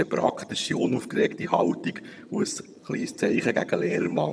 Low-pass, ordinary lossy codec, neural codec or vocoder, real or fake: none; none; vocoder, 22.05 kHz, 80 mel bands, HiFi-GAN; fake